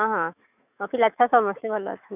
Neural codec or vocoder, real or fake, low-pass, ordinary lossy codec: autoencoder, 48 kHz, 128 numbers a frame, DAC-VAE, trained on Japanese speech; fake; 3.6 kHz; none